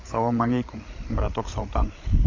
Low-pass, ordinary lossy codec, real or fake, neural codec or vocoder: 7.2 kHz; AAC, 32 kbps; fake; codec, 16 kHz, 16 kbps, FreqCodec, larger model